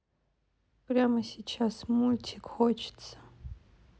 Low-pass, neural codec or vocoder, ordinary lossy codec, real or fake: none; none; none; real